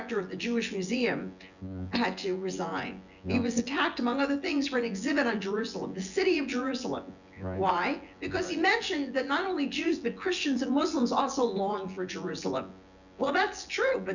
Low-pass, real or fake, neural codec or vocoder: 7.2 kHz; fake; vocoder, 24 kHz, 100 mel bands, Vocos